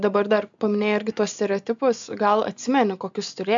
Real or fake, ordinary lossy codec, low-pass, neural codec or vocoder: real; MP3, 64 kbps; 7.2 kHz; none